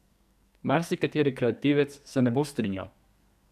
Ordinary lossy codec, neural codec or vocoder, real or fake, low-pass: none; codec, 44.1 kHz, 2.6 kbps, SNAC; fake; 14.4 kHz